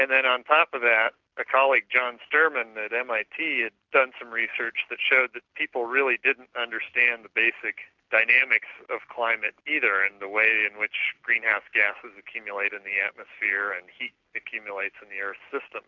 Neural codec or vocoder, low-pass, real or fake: none; 7.2 kHz; real